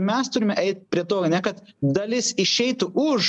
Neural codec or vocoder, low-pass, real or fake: none; 10.8 kHz; real